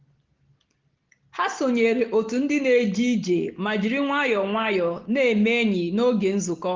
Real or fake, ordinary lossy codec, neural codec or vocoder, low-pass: real; Opus, 16 kbps; none; 7.2 kHz